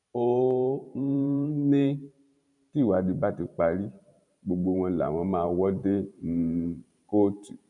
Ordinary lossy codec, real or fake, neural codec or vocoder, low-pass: none; fake; vocoder, 44.1 kHz, 128 mel bands every 512 samples, BigVGAN v2; 10.8 kHz